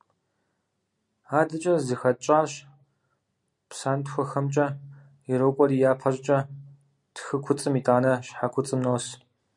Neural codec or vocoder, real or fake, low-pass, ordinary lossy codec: none; real; 10.8 kHz; MP3, 64 kbps